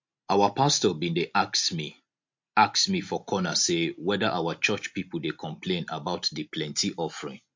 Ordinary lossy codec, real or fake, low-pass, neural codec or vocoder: MP3, 48 kbps; real; 7.2 kHz; none